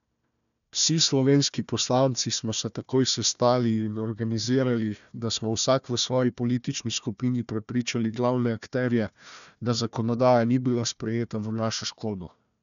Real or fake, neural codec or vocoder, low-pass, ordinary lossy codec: fake; codec, 16 kHz, 1 kbps, FunCodec, trained on Chinese and English, 50 frames a second; 7.2 kHz; none